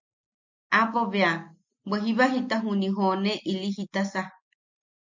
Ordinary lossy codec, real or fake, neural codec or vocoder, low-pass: MP3, 48 kbps; real; none; 7.2 kHz